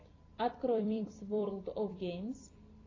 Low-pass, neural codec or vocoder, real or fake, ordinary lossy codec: 7.2 kHz; vocoder, 44.1 kHz, 128 mel bands every 256 samples, BigVGAN v2; fake; AAC, 32 kbps